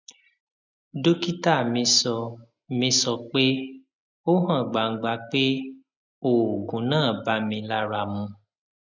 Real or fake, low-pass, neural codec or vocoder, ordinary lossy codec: real; 7.2 kHz; none; none